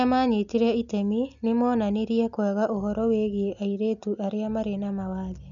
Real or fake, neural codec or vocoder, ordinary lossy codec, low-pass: real; none; none; 7.2 kHz